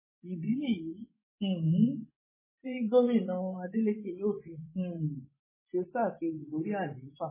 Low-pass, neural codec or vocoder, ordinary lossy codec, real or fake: 3.6 kHz; vocoder, 24 kHz, 100 mel bands, Vocos; MP3, 16 kbps; fake